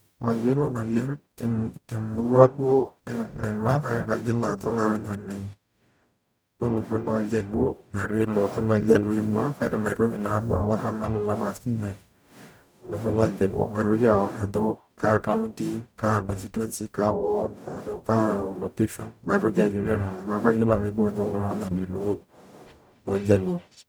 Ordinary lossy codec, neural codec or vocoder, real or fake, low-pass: none; codec, 44.1 kHz, 0.9 kbps, DAC; fake; none